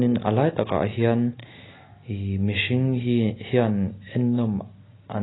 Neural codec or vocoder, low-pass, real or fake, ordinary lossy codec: none; 7.2 kHz; real; AAC, 16 kbps